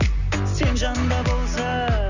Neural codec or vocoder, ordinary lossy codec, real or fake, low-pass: none; none; real; 7.2 kHz